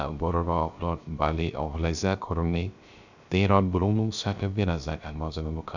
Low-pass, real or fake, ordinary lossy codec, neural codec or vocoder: 7.2 kHz; fake; none; codec, 16 kHz, 0.3 kbps, FocalCodec